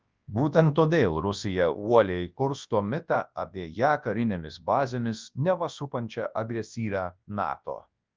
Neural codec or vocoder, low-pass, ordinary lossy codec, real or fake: codec, 24 kHz, 0.9 kbps, WavTokenizer, large speech release; 7.2 kHz; Opus, 24 kbps; fake